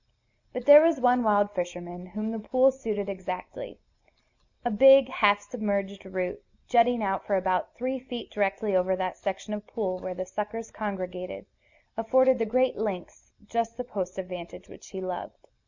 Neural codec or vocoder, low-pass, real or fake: none; 7.2 kHz; real